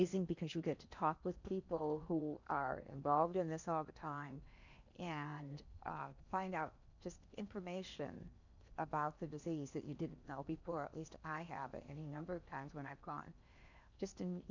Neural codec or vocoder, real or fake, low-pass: codec, 16 kHz in and 24 kHz out, 0.8 kbps, FocalCodec, streaming, 65536 codes; fake; 7.2 kHz